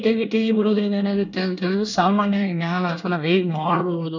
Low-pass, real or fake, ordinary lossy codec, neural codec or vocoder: 7.2 kHz; fake; none; codec, 24 kHz, 1 kbps, SNAC